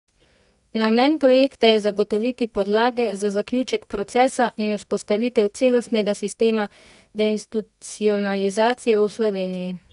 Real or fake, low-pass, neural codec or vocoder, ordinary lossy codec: fake; 10.8 kHz; codec, 24 kHz, 0.9 kbps, WavTokenizer, medium music audio release; none